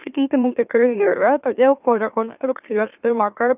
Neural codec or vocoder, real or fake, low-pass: autoencoder, 44.1 kHz, a latent of 192 numbers a frame, MeloTTS; fake; 3.6 kHz